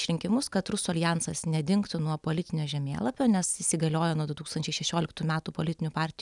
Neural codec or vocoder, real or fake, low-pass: none; real; 10.8 kHz